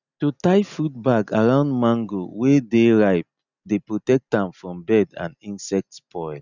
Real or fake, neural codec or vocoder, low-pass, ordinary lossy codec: real; none; 7.2 kHz; none